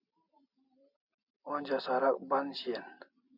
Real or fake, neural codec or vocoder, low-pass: real; none; 5.4 kHz